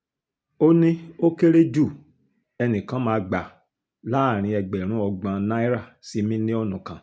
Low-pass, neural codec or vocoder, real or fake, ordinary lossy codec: none; none; real; none